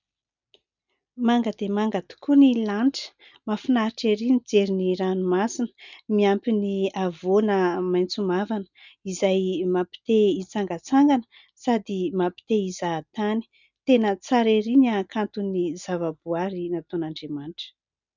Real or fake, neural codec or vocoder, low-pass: real; none; 7.2 kHz